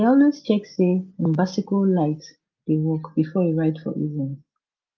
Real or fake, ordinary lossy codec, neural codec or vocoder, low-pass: real; Opus, 32 kbps; none; 7.2 kHz